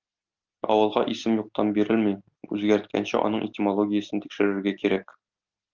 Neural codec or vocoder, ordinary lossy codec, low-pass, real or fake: none; Opus, 24 kbps; 7.2 kHz; real